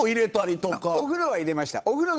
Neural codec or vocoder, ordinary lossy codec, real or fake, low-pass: codec, 16 kHz, 8 kbps, FunCodec, trained on Chinese and English, 25 frames a second; none; fake; none